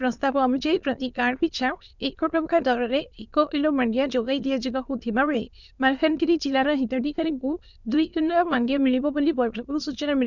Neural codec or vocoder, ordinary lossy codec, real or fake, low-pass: autoencoder, 22.05 kHz, a latent of 192 numbers a frame, VITS, trained on many speakers; none; fake; 7.2 kHz